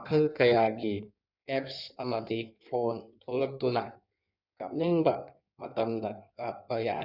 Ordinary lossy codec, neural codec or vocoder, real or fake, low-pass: none; codec, 16 kHz in and 24 kHz out, 1.1 kbps, FireRedTTS-2 codec; fake; 5.4 kHz